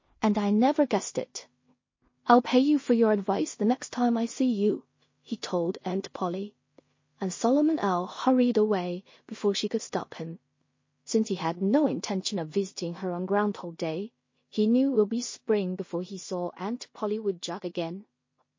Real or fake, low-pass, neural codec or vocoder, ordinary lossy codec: fake; 7.2 kHz; codec, 16 kHz in and 24 kHz out, 0.4 kbps, LongCat-Audio-Codec, two codebook decoder; MP3, 32 kbps